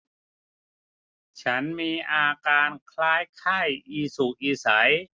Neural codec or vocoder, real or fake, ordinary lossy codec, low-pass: none; real; none; none